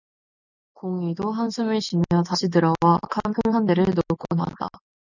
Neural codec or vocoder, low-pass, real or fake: none; 7.2 kHz; real